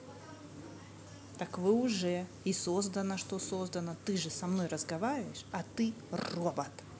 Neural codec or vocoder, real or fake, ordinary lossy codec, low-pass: none; real; none; none